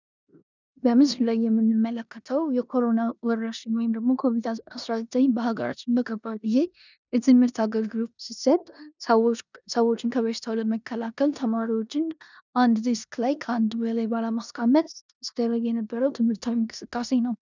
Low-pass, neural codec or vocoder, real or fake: 7.2 kHz; codec, 16 kHz in and 24 kHz out, 0.9 kbps, LongCat-Audio-Codec, four codebook decoder; fake